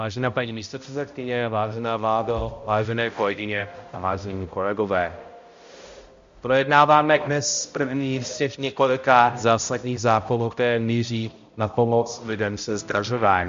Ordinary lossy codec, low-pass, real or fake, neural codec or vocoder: MP3, 48 kbps; 7.2 kHz; fake; codec, 16 kHz, 0.5 kbps, X-Codec, HuBERT features, trained on balanced general audio